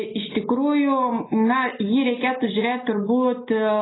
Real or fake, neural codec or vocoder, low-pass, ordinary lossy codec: real; none; 7.2 kHz; AAC, 16 kbps